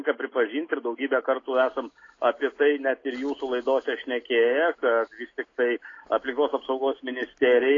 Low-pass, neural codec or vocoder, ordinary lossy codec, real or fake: 7.2 kHz; none; AAC, 32 kbps; real